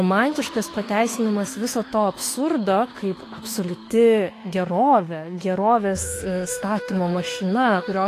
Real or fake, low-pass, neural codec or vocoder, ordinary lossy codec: fake; 14.4 kHz; autoencoder, 48 kHz, 32 numbers a frame, DAC-VAE, trained on Japanese speech; AAC, 48 kbps